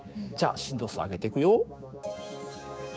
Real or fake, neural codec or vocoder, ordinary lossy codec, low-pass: fake; codec, 16 kHz, 6 kbps, DAC; none; none